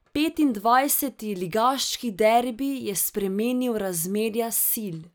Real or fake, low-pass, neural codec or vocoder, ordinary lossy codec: real; none; none; none